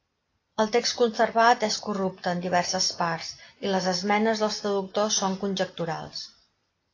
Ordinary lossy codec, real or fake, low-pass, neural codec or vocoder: AAC, 32 kbps; real; 7.2 kHz; none